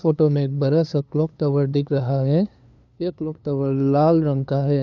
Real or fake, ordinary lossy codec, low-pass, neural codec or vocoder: fake; none; 7.2 kHz; codec, 16 kHz, 2 kbps, FunCodec, trained on LibriTTS, 25 frames a second